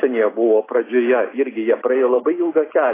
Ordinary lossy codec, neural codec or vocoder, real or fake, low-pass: AAC, 16 kbps; none; real; 3.6 kHz